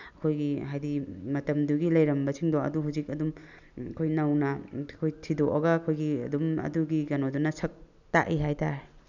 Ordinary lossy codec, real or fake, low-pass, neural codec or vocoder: none; real; 7.2 kHz; none